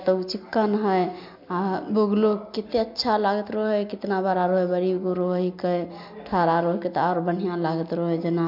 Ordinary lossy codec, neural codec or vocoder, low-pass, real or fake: MP3, 48 kbps; none; 5.4 kHz; real